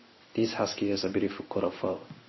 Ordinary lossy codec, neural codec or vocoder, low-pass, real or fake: MP3, 24 kbps; codec, 16 kHz in and 24 kHz out, 1 kbps, XY-Tokenizer; 7.2 kHz; fake